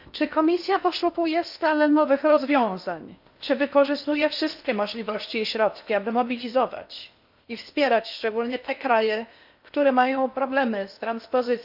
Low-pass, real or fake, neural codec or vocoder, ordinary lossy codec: 5.4 kHz; fake; codec, 16 kHz in and 24 kHz out, 0.8 kbps, FocalCodec, streaming, 65536 codes; AAC, 48 kbps